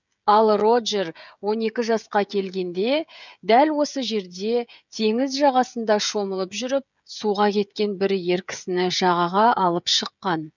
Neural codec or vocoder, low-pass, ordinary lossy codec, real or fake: codec, 16 kHz, 16 kbps, FreqCodec, smaller model; 7.2 kHz; none; fake